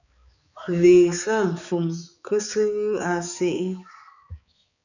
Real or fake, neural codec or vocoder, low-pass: fake; codec, 16 kHz, 4 kbps, X-Codec, HuBERT features, trained on balanced general audio; 7.2 kHz